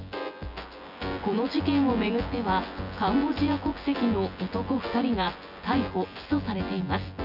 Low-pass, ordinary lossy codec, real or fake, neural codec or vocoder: 5.4 kHz; none; fake; vocoder, 24 kHz, 100 mel bands, Vocos